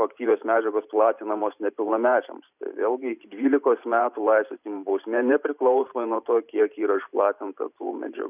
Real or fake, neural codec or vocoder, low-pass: fake; vocoder, 44.1 kHz, 128 mel bands every 512 samples, BigVGAN v2; 3.6 kHz